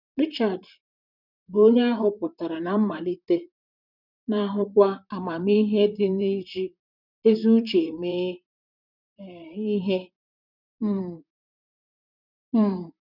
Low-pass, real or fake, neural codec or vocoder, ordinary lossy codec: 5.4 kHz; fake; vocoder, 44.1 kHz, 128 mel bands, Pupu-Vocoder; none